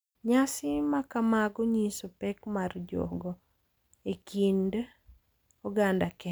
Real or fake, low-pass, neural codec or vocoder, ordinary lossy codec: real; none; none; none